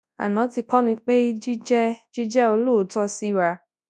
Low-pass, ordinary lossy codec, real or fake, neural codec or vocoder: none; none; fake; codec, 24 kHz, 0.9 kbps, WavTokenizer, large speech release